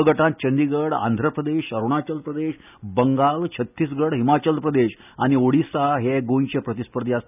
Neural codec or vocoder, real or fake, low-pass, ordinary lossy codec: none; real; 3.6 kHz; none